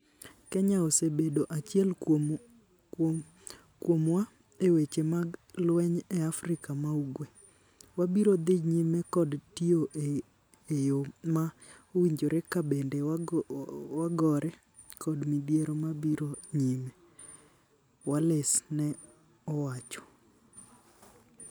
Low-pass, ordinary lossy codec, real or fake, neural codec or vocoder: none; none; real; none